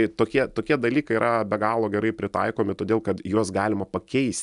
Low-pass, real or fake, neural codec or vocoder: 10.8 kHz; real; none